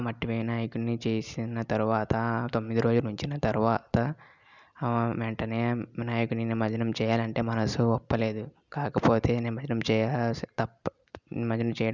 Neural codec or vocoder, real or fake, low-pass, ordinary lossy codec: none; real; 7.2 kHz; none